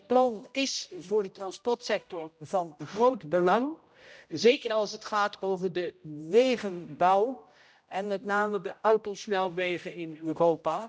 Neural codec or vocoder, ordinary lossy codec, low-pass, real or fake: codec, 16 kHz, 0.5 kbps, X-Codec, HuBERT features, trained on general audio; none; none; fake